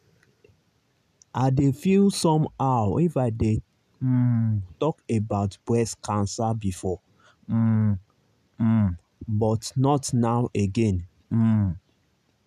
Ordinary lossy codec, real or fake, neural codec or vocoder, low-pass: none; real; none; 14.4 kHz